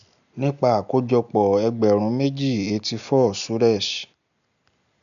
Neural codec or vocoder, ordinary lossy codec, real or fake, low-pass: none; none; real; 7.2 kHz